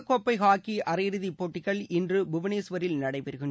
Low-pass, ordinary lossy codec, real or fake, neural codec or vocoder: none; none; real; none